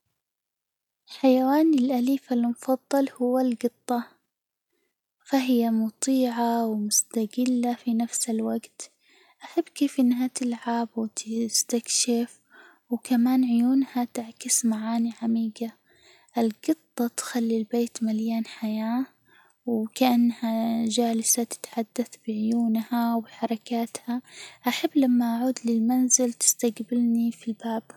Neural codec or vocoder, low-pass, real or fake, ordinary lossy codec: none; 19.8 kHz; real; none